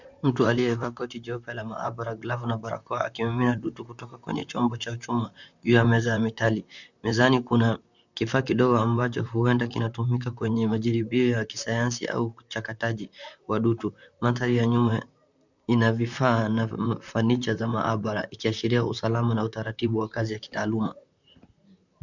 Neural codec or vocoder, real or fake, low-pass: vocoder, 44.1 kHz, 128 mel bands, Pupu-Vocoder; fake; 7.2 kHz